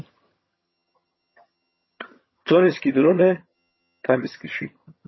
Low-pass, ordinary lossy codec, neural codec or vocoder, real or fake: 7.2 kHz; MP3, 24 kbps; vocoder, 22.05 kHz, 80 mel bands, HiFi-GAN; fake